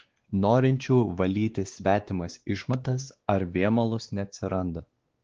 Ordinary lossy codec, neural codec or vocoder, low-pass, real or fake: Opus, 16 kbps; codec, 16 kHz, 2 kbps, X-Codec, WavLM features, trained on Multilingual LibriSpeech; 7.2 kHz; fake